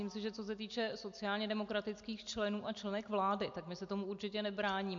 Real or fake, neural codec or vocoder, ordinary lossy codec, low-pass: real; none; MP3, 48 kbps; 7.2 kHz